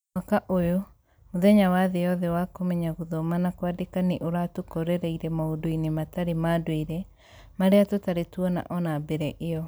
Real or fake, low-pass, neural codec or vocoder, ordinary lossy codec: real; none; none; none